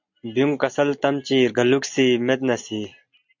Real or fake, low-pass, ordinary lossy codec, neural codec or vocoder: real; 7.2 kHz; MP3, 64 kbps; none